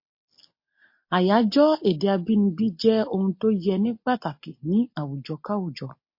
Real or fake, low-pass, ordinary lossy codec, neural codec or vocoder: real; 5.4 kHz; MP3, 32 kbps; none